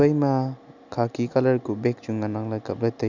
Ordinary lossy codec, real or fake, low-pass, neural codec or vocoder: none; real; 7.2 kHz; none